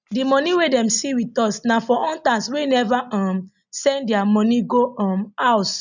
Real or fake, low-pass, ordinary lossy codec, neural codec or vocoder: real; 7.2 kHz; none; none